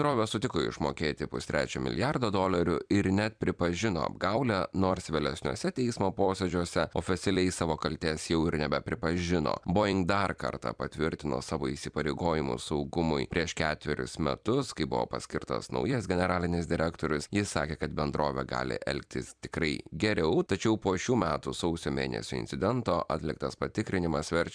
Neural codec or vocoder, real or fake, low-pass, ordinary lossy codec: vocoder, 44.1 kHz, 128 mel bands every 256 samples, BigVGAN v2; fake; 9.9 kHz; MP3, 96 kbps